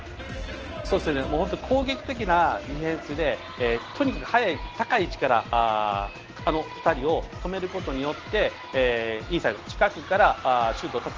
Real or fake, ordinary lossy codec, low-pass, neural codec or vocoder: real; Opus, 16 kbps; 7.2 kHz; none